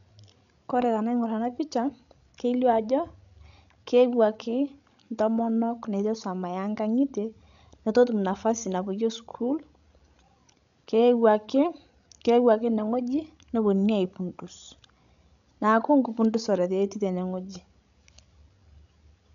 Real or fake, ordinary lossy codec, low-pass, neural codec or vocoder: fake; none; 7.2 kHz; codec, 16 kHz, 16 kbps, FreqCodec, larger model